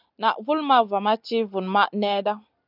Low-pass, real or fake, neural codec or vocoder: 5.4 kHz; real; none